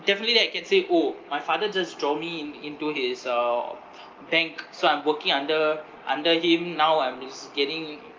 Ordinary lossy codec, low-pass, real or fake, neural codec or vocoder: Opus, 24 kbps; 7.2 kHz; real; none